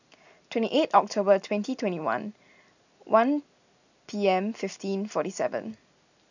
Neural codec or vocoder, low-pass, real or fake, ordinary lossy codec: none; 7.2 kHz; real; none